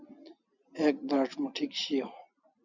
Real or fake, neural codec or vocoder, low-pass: real; none; 7.2 kHz